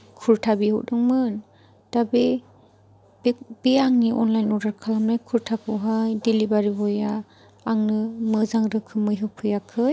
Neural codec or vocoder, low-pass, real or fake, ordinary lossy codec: none; none; real; none